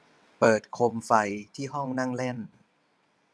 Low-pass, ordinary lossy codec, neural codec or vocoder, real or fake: none; none; vocoder, 22.05 kHz, 80 mel bands, WaveNeXt; fake